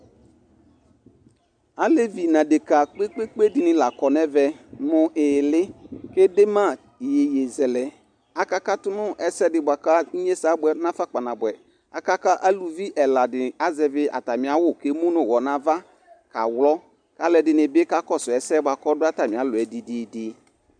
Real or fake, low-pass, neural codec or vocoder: real; 9.9 kHz; none